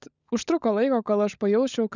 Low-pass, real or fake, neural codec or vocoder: 7.2 kHz; fake; codec, 16 kHz, 16 kbps, FunCodec, trained on LibriTTS, 50 frames a second